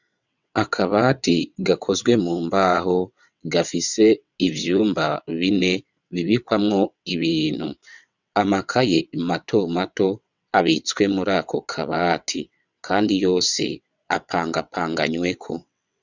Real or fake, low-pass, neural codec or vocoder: fake; 7.2 kHz; vocoder, 22.05 kHz, 80 mel bands, WaveNeXt